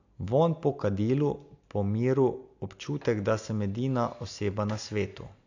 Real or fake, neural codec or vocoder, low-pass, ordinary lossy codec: real; none; 7.2 kHz; AAC, 48 kbps